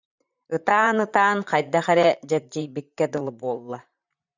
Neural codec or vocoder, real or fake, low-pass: vocoder, 44.1 kHz, 128 mel bands, Pupu-Vocoder; fake; 7.2 kHz